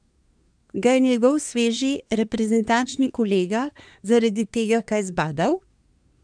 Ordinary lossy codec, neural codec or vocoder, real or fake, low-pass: none; codec, 24 kHz, 1 kbps, SNAC; fake; 9.9 kHz